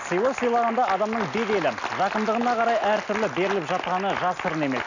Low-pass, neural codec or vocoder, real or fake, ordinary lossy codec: 7.2 kHz; none; real; none